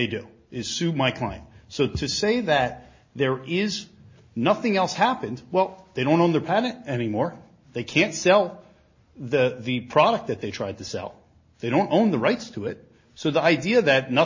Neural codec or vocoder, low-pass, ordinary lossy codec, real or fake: none; 7.2 kHz; MP3, 32 kbps; real